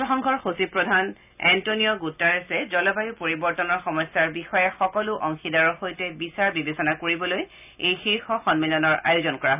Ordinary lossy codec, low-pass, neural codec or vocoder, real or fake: none; 3.6 kHz; none; real